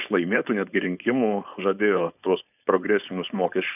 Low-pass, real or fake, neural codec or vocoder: 3.6 kHz; fake; codec, 16 kHz, 4.8 kbps, FACodec